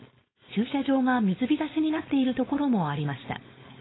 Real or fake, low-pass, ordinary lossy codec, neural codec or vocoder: fake; 7.2 kHz; AAC, 16 kbps; codec, 16 kHz, 4.8 kbps, FACodec